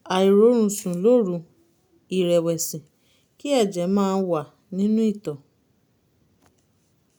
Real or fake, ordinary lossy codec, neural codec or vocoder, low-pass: real; none; none; none